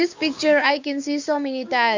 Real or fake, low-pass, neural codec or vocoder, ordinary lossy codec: fake; 7.2 kHz; codec, 16 kHz, 6 kbps, DAC; Opus, 64 kbps